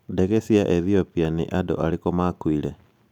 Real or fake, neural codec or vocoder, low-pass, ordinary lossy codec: real; none; 19.8 kHz; none